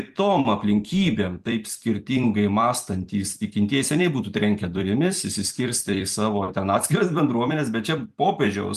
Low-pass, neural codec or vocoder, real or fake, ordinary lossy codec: 14.4 kHz; vocoder, 44.1 kHz, 128 mel bands every 256 samples, BigVGAN v2; fake; Opus, 32 kbps